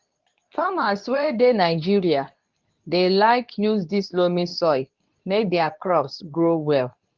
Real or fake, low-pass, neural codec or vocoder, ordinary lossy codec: fake; 7.2 kHz; codec, 24 kHz, 0.9 kbps, WavTokenizer, medium speech release version 2; Opus, 32 kbps